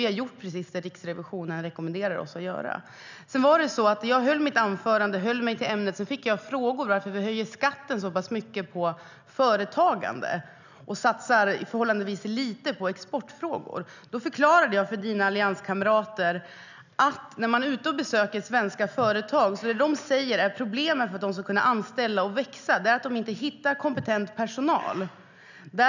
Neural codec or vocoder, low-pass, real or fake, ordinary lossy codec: none; 7.2 kHz; real; none